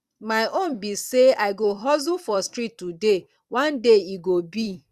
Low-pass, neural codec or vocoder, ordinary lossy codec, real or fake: 14.4 kHz; vocoder, 44.1 kHz, 128 mel bands every 256 samples, BigVGAN v2; Opus, 64 kbps; fake